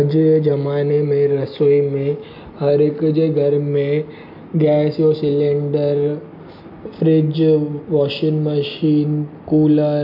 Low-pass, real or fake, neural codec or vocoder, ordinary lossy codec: 5.4 kHz; real; none; none